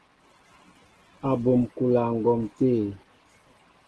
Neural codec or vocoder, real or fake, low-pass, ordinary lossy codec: none; real; 10.8 kHz; Opus, 16 kbps